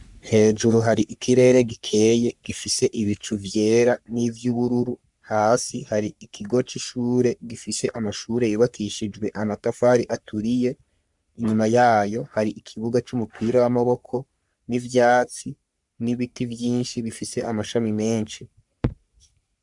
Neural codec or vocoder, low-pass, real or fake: codec, 44.1 kHz, 3.4 kbps, Pupu-Codec; 10.8 kHz; fake